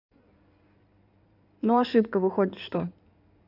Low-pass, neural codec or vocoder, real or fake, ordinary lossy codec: 5.4 kHz; codec, 16 kHz in and 24 kHz out, 2.2 kbps, FireRedTTS-2 codec; fake; none